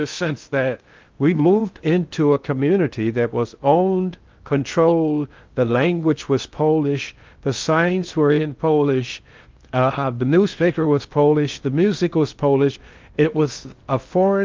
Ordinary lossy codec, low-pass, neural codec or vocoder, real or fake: Opus, 24 kbps; 7.2 kHz; codec, 16 kHz in and 24 kHz out, 0.6 kbps, FocalCodec, streaming, 2048 codes; fake